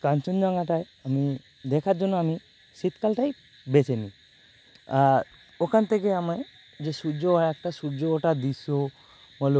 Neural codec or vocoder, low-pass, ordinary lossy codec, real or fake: none; none; none; real